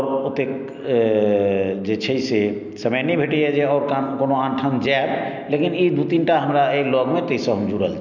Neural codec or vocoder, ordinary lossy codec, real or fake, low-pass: none; none; real; 7.2 kHz